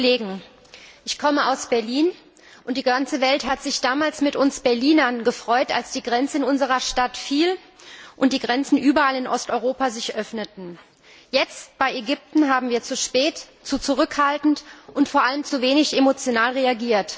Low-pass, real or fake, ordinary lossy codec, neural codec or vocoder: none; real; none; none